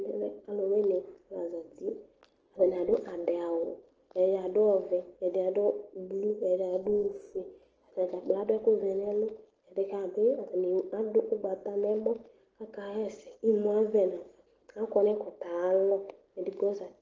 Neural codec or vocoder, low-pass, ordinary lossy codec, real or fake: none; 7.2 kHz; Opus, 16 kbps; real